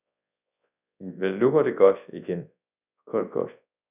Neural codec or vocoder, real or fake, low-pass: codec, 24 kHz, 0.9 kbps, WavTokenizer, large speech release; fake; 3.6 kHz